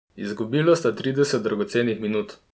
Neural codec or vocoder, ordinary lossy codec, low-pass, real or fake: none; none; none; real